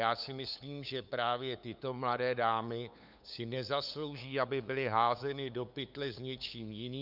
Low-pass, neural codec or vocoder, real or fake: 5.4 kHz; codec, 16 kHz, 8 kbps, FunCodec, trained on LibriTTS, 25 frames a second; fake